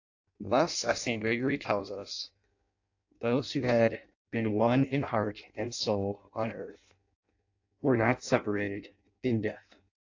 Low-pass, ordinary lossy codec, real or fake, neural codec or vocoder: 7.2 kHz; AAC, 48 kbps; fake; codec, 16 kHz in and 24 kHz out, 0.6 kbps, FireRedTTS-2 codec